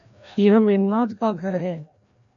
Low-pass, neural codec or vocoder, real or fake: 7.2 kHz; codec, 16 kHz, 1 kbps, FreqCodec, larger model; fake